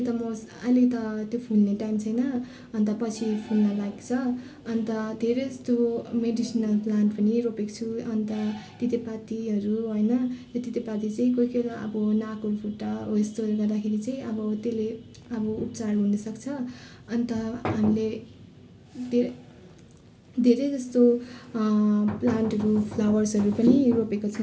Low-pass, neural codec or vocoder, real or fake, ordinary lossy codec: none; none; real; none